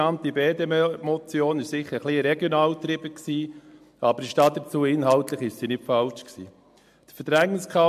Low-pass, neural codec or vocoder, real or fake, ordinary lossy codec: 14.4 kHz; none; real; MP3, 64 kbps